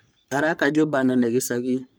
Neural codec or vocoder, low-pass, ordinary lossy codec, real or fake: codec, 44.1 kHz, 3.4 kbps, Pupu-Codec; none; none; fake